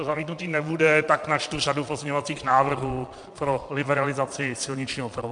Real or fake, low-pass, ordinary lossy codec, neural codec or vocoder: fake; 9.9 kHz; MP3, 64 kbps; vocoder, 22.05 kHz, 80 mel bands, Vocos